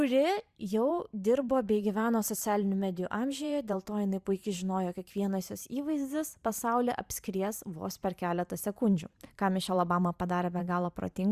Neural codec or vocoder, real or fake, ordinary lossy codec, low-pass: vocoder, 44.1 kHz, 128 mel bands every 512 samples, BigVGAN v2; fake; Opus, 64 kbps; 14.4 kHz